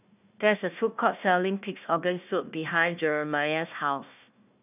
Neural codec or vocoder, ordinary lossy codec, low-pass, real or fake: codec, 16 kHz, 1 kbps, FunCodec, trained on Chinese and English, 50 frames a second; none; 3.6 kHz; fake